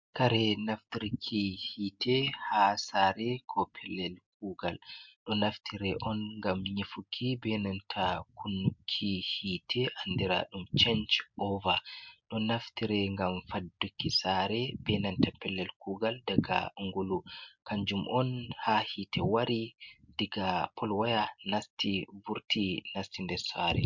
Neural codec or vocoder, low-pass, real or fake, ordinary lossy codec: none; 7.2 kHz; real; MP3, 64 kbps